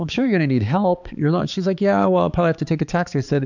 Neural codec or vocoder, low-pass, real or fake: codec, 16 kHz, 4 kbps, X-Codec, HuBERT features, trained on balanced general audio; 7.2 kHz; fake